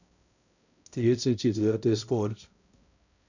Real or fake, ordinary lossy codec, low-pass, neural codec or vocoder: fake; none; 7.2 kHz; codec, 16 kHz, 0.5 kbps, X-Codec, HuBERT features, trained on balanced general audio